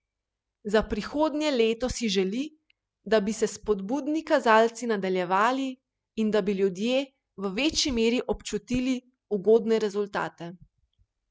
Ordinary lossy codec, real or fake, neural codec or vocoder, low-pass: none; real; none; none